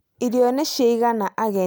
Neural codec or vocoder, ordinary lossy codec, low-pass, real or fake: none; none; none; real